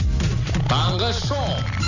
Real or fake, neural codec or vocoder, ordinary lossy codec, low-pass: real; none; none; 7.2 kHz